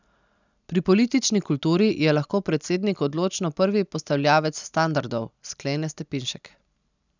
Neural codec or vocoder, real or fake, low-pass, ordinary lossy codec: none; real; 7.2 kHz; none